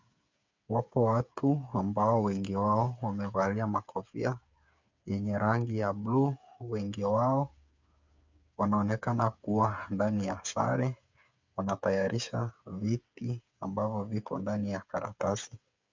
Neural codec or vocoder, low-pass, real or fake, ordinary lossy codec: codec, 16 kHz, 8 kbps, FreqCodec, smaller model; 7.2 kHz; fake; MP3, 64 kbps